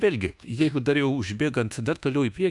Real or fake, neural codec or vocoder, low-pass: fake; codec, 24 kHz, 1.2 kbps, DualCodec; 10.8 kHz